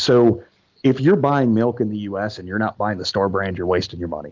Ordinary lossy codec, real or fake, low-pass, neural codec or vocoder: Opus, 24 kbps; real; 7.2 kHz; none